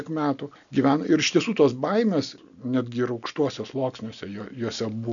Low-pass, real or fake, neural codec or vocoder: 7.2 kHz; real; none